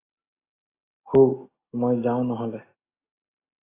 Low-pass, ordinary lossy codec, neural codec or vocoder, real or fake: 3.6 kHz; AAC, 16 kbps; none; real